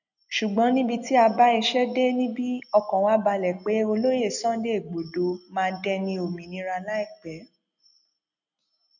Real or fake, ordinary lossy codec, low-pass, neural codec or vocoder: real; none; 7.2 kHz; none